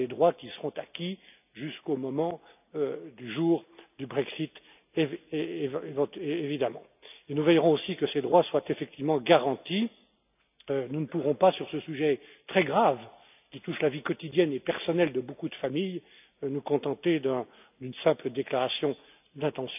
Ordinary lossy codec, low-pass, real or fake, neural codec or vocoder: none; 3.6 kHz; real; none